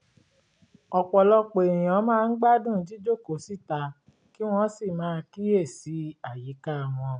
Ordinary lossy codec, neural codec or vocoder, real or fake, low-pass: none; none; real; 9.9 kHz